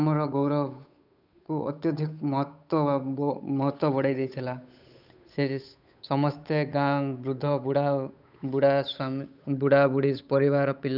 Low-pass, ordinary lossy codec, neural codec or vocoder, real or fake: 5.4 kHz; none; codec, 16 kHz, 8 kbps, FunCodec, trained on Chinese and English, 25 frames a second; fake